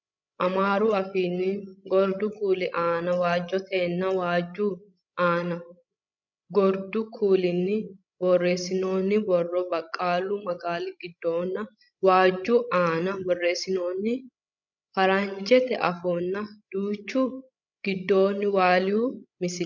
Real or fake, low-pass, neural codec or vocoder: fake; 7.2 kHz; codec, 16 kHz, 16 kbps, FreqCodec, larger model